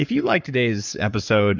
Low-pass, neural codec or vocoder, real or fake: 7.2 kHz; codec, 44.1 kHz, 7.8 kbps, Pupu-Codec; fake